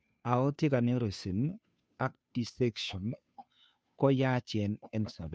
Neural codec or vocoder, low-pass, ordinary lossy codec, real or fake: codec, 16 kHz, 2 kbps, FunCodec, trained on Chinese and English, 25 frames a second; none; none; fake